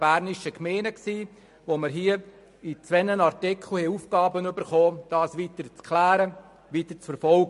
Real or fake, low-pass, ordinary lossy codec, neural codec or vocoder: real; 14.4 kHz; MP3, 48 kbps; none